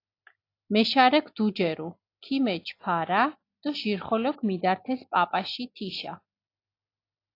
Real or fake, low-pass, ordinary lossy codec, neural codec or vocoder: real; 5.4 kHz; AAC, 32 kbps; none